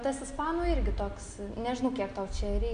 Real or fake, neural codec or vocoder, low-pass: real; none; 9.9 kHz